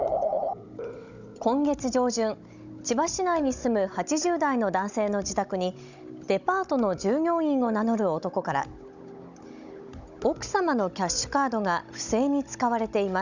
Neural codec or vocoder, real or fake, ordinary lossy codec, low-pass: codec, 16 kHz, 16 kbps, FunCodec, trained on Chinese and English, 50 frames a second; fake; none; 7.2 kHz